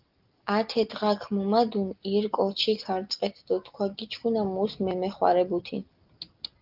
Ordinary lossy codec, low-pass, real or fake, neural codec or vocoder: Opus, 32 kbps; 5.4 kHz; real; none